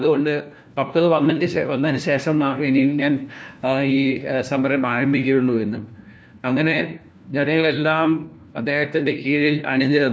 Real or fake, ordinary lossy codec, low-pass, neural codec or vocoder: fake; none; none; codec, 16 kHz, 1 kbps, FunCodec, trained on LibriTTS, 50 frames a second